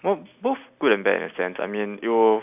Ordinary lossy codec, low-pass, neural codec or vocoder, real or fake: none; 3.6 kHz; none; real